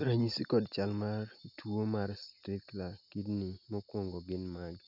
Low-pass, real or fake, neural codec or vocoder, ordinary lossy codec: 5.4 kHz; real; none; none